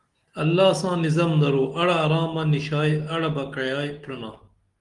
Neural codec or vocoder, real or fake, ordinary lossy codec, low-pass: none; real; Opus, 24 kbps; 10.8 kHz